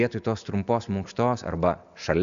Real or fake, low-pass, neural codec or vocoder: real; 7.2 kHz; none